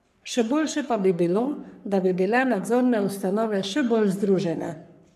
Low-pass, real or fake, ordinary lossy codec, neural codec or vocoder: 14.4 kHz; fake; none; codec, 44.1 kHz, 3.4 kbps, Pupu-Codec